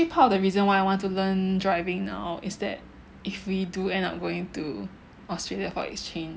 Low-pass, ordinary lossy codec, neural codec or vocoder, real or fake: none; none; none; real